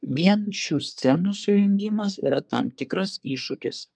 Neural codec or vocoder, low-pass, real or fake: codec, 24 kHz, 1 kbps, SNAC; 9.9 kHz; fake